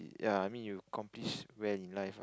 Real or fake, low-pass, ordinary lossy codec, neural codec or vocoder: real; none; none; none